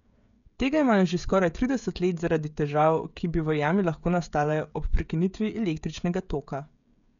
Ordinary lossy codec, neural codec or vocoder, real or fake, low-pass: none; codec, 16 kHz, 16 kbps, FreqCodec, smaller model; fake; 7.2 kHz